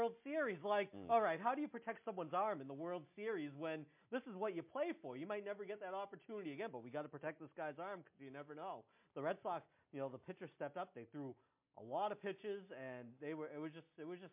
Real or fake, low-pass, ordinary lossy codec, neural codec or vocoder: real; 3.6 kHz; MP3, 32 kbps; none